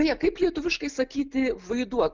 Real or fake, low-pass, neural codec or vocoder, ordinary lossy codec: real; 7.2 kHz; none; Opus, 32 kbps